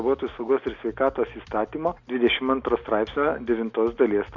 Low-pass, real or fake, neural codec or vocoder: 7.2 kHz; real; none